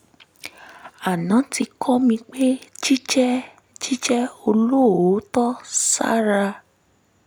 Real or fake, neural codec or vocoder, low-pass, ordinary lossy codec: real; none; none; none